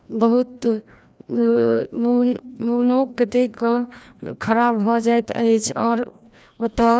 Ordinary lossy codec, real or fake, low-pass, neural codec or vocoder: none; fake; none; codec, 16 kHz, 1 kbps, FreqCodec, larger model